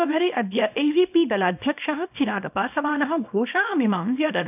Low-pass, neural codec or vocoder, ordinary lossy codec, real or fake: 3.6 kHz; codec, 24 kHz, 0.9 kbps, WavTokenizer, small release; none; fake